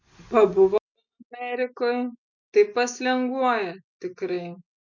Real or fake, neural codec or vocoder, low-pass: real; none; 7.2 kHz